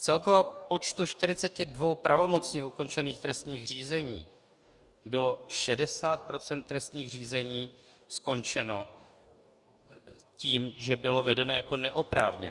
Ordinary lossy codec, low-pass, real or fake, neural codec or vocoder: Opus, 64 kbps; 10.8 kHz; fake; codec, 44.1 kHz, 2.6 kbps, DAC